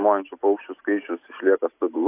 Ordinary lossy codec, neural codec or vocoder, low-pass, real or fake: AAC, 24 kbps; none; 3.6 kHz; real